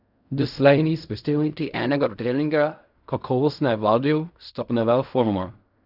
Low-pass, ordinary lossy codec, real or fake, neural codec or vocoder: 5.4 kHz; none; fake; codec, 16 kHz in and 24 kHz out, 0.4 kbps, LongCat-Audio-Codec, fine tuned four codebook decoder